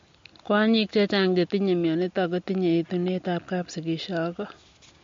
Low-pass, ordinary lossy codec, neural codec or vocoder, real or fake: 7.2 kHz; MP3, 48 kbps; none; real